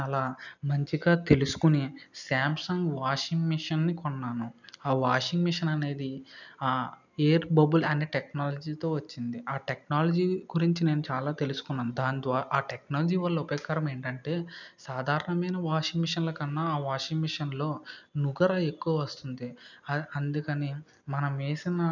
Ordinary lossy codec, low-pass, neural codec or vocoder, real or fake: none; 7.2 kHz; none; real